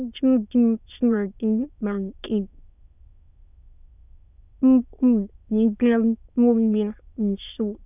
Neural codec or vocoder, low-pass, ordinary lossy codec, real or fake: autoencoder, 22.05 kHz, a latent of 192 numbers a frame, VITS, trained on many speakers; 3.6 kHz; none; fake